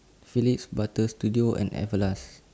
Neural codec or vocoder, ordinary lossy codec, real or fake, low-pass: none; none; real; none